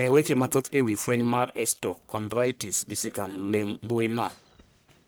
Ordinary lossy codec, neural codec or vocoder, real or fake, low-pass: none; codec, 44.1 kHz, 1.7 kbps, Pupu-Codec; fake; none